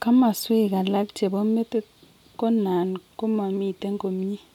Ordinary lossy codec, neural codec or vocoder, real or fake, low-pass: none; none; real; 19.8 kHz